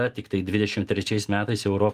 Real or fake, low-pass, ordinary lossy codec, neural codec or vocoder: real; 14.4 kHz; Opus, 32 kbps; none